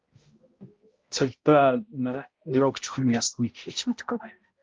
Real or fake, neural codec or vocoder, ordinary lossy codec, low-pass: fake; codec, 16 kHz, 0.5 kbps, X-Codec, HuBERT features, trained on balanced general audio; Opus, 32 kbps; 7.2 kHz